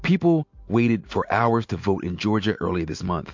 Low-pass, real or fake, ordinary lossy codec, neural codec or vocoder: 7.2 kHz; real; MP3, 48 kbps; none